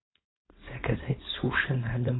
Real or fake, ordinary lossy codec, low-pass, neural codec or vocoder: fake; AAC, 16 kbps; 7.2 kHz; codec, 16 kHz, 4.8 kbps, FACodec